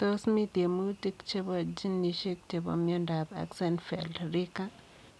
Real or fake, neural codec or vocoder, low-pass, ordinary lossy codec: real; none; none; none